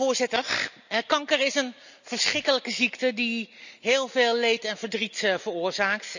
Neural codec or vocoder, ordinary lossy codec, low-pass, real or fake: none; none; 7.2 kHz; real